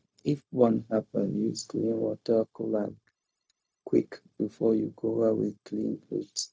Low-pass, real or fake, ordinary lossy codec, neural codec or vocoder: none; fake; none; codec, 16 kHz, 0.4 kbps, LongCat-Audio-Codec